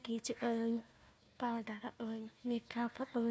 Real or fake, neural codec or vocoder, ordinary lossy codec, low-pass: fake; codec, 16 kHz, 1 kbps, FunCodec, trained on Chinese and English, 50 frames a second; none; none